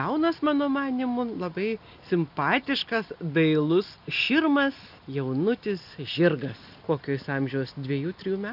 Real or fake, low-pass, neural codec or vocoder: real; 5.4 kHz; none